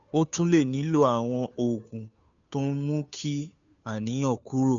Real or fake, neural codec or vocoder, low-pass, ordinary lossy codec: fake; codec, 16 kHz, 2 kbps, FunCodec, trained on Chinese and English, 25 frames a second; 7.2 kHz; none